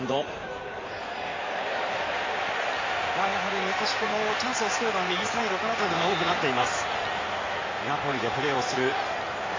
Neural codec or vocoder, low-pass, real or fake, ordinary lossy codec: codec, 16 kHz in and 24 kHz out, 2.2 kbps, FireRedTTS-2 codec; 7.2 kHz; fake; MP3, 32 kbps